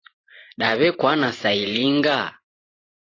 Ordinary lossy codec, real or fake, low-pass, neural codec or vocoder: AAC, 32 kbps; fake; 7.2 kHz; vocoder, 44.1 kHz, 128 mel bands every 512 samples, BigVGAN v2